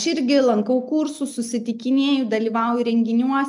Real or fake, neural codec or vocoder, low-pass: real; none; 10.8 kHz